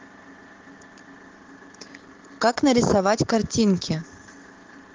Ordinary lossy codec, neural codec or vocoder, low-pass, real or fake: Opus, 16 kbps; vocoder, 44.1 kHz, 128 mel bands every 512 samples, BigVGAN v2; 7.2 kHz; fake